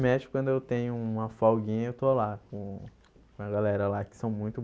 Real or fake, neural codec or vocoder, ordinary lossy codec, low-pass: real; none; none; none